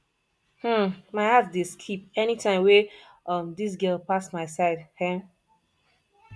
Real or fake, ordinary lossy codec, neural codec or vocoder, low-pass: real; none; none; none